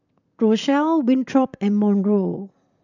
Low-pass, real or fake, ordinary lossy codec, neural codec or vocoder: 7.2 kHz; fake; none; vocoder, 44.1 kHz, 128 mel bands, Pupu-Vocoder